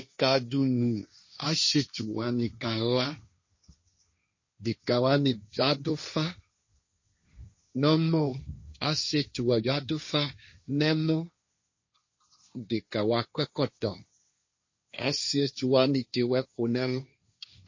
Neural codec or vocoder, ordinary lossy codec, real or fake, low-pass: codec, 16 kHz, 1.1 kbps, Voila-Tokenizer; MP3, 32 kbps; fake; 7.2 kHz